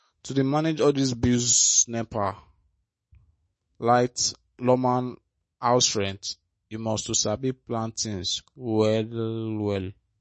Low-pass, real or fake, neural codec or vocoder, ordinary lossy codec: 10.8 kHz; fake; autoencoder, 48 kHz, 128 numbers a frame, DAC-VAE, trained on Japanese speech; MP3, 32 kbps